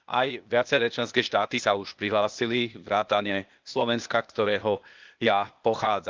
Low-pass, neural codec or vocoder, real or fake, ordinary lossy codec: 7.2 kHz; codec, 16 kHz, 0.8 kbps, ZipCodec; fake; Opus, 24 kbps